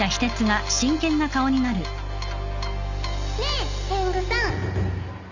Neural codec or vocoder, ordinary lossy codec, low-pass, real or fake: none; none; 7.2 kHz; real